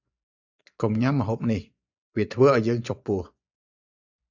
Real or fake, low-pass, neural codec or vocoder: fake; 7.2 kHz; vocoder, 24 kHz, 100 mel bands, Vocos